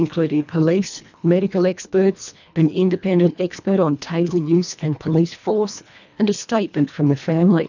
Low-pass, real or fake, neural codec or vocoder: 7.2 kHz; fake; codec, 24 kHz, 1.5 kbps, HILCodec